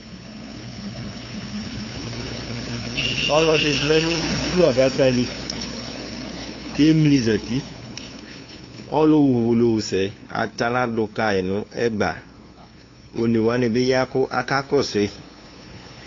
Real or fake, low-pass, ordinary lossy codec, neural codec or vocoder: fake; 7.2 kHz; AAC, 32 kbps; codec, 16 kHz, 2 kbps, FunCodec, trained on LibriTTS, 25 frames a second